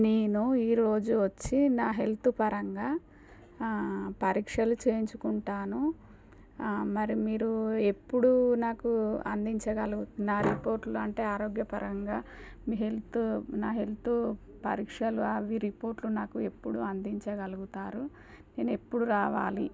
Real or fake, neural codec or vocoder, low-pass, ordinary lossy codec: real; none; none; none